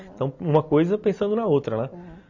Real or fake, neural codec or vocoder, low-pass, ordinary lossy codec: real; none; 7.2 kHz; none